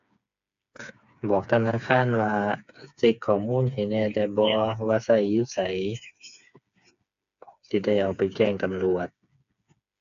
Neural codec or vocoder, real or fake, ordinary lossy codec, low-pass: codec, 16 kHz, 4 kbps, FreqCodec, smaller model; fake; AAC, 96 kbps; 7.2 kHz